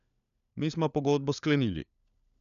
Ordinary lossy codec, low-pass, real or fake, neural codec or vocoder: none; 7.2 kHz; fake; codec, 16 kHz, 4 kbps, FunCodec, trained on LibriTTS, 50 frames a second